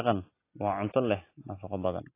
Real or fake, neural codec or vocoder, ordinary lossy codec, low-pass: real; none; AAC, 24 kbps; 3.6 kHz